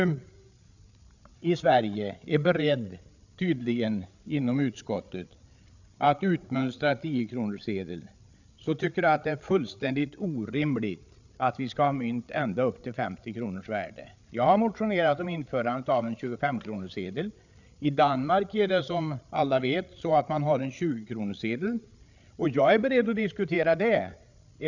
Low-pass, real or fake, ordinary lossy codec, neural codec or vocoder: 7.2 kHz; fake; none; codec, 16 kHz, 8 kbps, FreqCodec, larger model